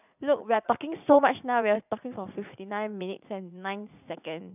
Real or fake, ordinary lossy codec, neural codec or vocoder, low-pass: fake; none; vocoder, 44.1 kHz, 80 mel bands, Vocos; 3.6 kHz